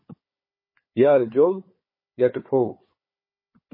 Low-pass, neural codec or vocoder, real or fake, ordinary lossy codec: 5.4 kHz; codec, 16 kHz, 4 kbps, FunCodec, trained on Chinese and English, 50 frames a second; fake; MP3, 24 kbps